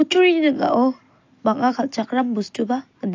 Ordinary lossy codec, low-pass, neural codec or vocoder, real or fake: AAC, 48 kbps; 7.2 kHz; none; real